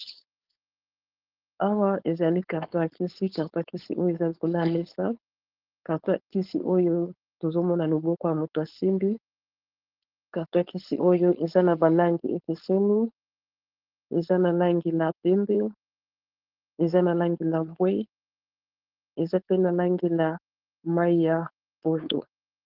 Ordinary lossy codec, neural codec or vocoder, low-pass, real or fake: Opus, 16 kbps; codec, 16 kHz, 4.8 kbps, FACodec; 5.4 kHz; fake